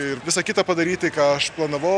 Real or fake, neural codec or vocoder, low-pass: real; none; 9.9 kHz